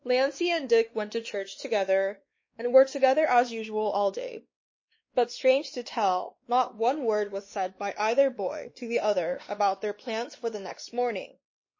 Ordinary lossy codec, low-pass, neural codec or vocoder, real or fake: MP3, 32 kbps; 7.2 kHz; codec, 16 kHz, 2 kbps, X-Codec, WavLM features, trained on Multilingual LibriSpeech; fake